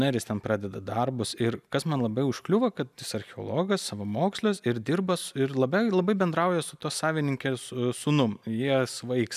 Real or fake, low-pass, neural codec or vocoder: real; 14.4 kHz; none